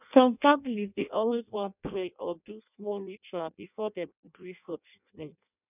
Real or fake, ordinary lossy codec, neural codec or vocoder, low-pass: fake; none; codec, 16 kHz in and 24 kHz out, 0.6 kbps, FireRedTTS-2 codec; 3.6 kHz